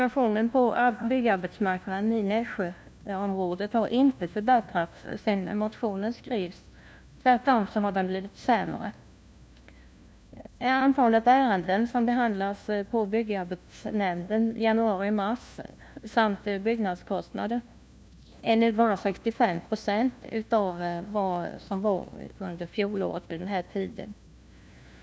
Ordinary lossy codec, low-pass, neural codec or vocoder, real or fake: none; none; codec, 16 kHz, 1 kbps, FunCodec, trained on LibriTTS, 50 frames a second; fake